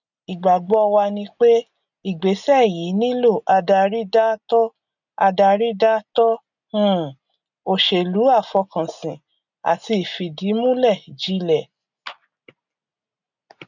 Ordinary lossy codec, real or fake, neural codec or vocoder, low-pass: none; real; none; 7.2 kHz